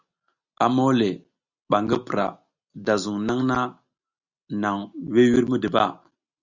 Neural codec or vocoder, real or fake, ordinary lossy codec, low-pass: none; real; Opus, 64 kbps; 7.2 kHz